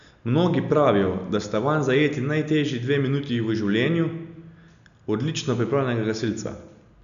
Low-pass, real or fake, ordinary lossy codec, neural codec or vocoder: 7.2 kHz; real; none; none